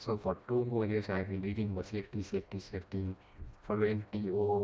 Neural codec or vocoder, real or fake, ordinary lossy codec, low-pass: codec, 16 kHz, 1 kbps, FreqCodec, smaller model; fake; none; none